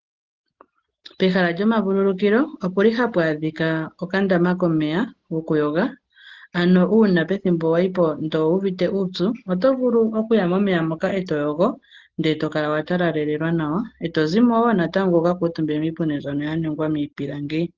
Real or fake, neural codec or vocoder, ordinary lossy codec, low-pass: real; none; Opus, 16 kbps; 7.2 kHz